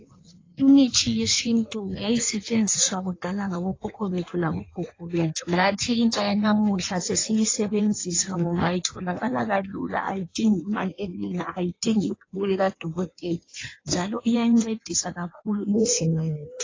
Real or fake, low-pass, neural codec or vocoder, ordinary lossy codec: fake; 7.2 kHz; codec, 16 kHz in and 24 kHz out, 1.1 kbps, FireRedTTS-2 codec; AAC, 32 kbps